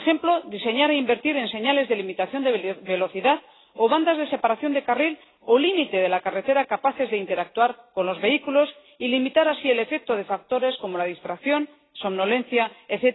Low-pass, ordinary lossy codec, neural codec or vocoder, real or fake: 7.2 kHz; AAC, 16 kbps; none; real